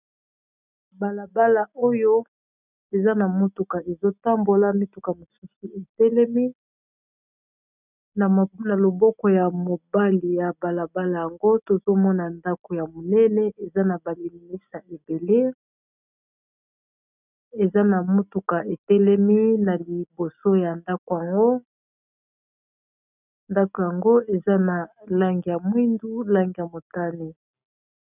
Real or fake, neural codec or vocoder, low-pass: real; none; 3.6 kHz